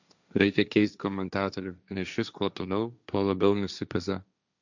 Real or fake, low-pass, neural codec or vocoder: fake; 7.2 kHz; codec, 16 kHz, 1.1 kbps, Voila-Tokenizer